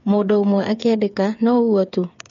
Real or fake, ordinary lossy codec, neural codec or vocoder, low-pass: fake; MP3, 48 kbps; codec, 16 kHz, 8 kbps, FreqCodec, smaller model; 7.2 kHz